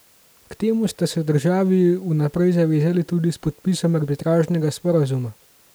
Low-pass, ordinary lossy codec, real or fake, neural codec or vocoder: none; none; real; none